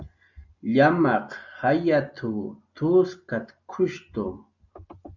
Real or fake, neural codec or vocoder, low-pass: real; none; 7.2 kHz